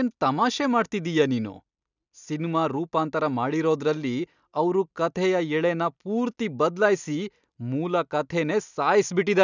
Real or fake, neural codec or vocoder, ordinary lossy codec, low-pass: real; none; none; 7.2 kHz